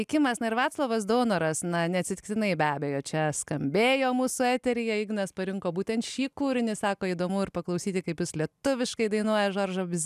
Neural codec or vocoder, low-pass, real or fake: none; 14.4 kHz; real